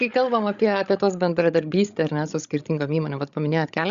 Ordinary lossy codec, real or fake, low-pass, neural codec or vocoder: Opus, 64 kbps; fake; 7.2 kHz; codec, 16 kHz, 16 kbps, FreqCodec, larger model